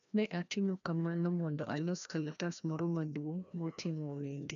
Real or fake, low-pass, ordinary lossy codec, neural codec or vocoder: fake; 7.2 kHz; none; codec, 16 kHz, 1 kbps, FreqCodec, larger model